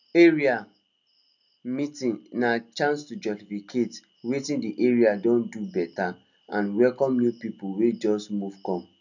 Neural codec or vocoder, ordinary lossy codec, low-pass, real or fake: none; none; 7.2 kHz; real